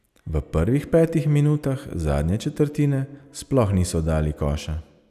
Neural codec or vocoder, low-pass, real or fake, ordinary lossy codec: none; 14.4 kHz; real; none